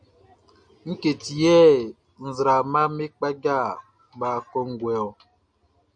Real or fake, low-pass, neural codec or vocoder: real; 9.9 kHz; none